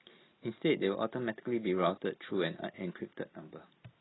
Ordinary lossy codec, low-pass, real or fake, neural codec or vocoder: AAC, 16 kbps; 7.2 kHz; real; none